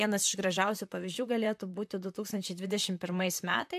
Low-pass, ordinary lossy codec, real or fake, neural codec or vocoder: 14.4 kHz; AAC, 96 kbps; fake; vocoder, 44.1 kHz, 128 mel bands, Pupu-Vocoder